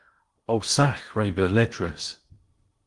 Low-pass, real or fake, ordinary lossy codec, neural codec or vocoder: 10.8 kHz; fake; Opus, 24 kbps; codec, 16 kHz in and 24 kHz out, 0.8 kbps, FocalCodec, streaming, 65536 codes